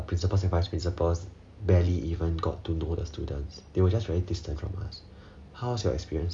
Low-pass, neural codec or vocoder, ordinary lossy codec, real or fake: 9.9 kHz; none; none; real